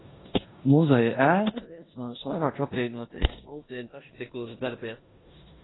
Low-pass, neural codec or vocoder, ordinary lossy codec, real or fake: 7.2 kHz; codec, 16 kHz in and 24 kHz out, 0.9 kbps, LongCat-Audio-Codec, four codebook decoder; AAC, 16 kbps; fake